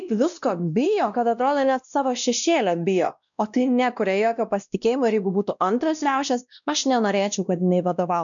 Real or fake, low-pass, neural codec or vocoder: fake; 7.2 kHz; codec, 16 kHz, 1 kbps, X-Codec, WavLM features, trained on Multilingual LibriSpeech